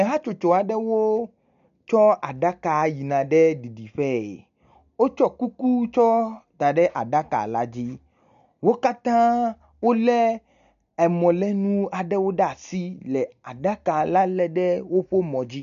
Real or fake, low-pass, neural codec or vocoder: real; 7.2 kHz; none